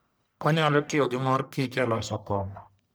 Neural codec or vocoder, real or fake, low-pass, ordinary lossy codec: codec, 44.1 kHz, 1.7 kbps, Pupu-Codec; fake; none; none